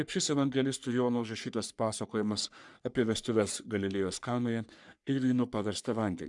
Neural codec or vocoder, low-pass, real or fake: codec, 44.1 kHz, 3.4 kbps, Pupu-Codec; 10.8 kHz; fake